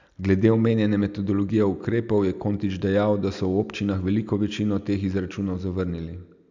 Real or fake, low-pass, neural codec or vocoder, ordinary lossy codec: real; 7.2 kHz; none; none